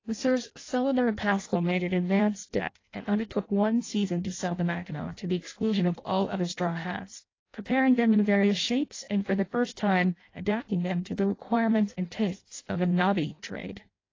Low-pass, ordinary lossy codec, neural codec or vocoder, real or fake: 7.2 kHz; AAC, 32 kbps; codec, 16 kHz in and 24 kHz out, 0.6 kbps, FireRedTTS-2 codec; fake